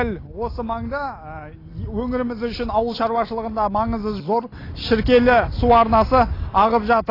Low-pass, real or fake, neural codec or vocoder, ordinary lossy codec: 5.4 kHz; real; none; AAC, 24 kbps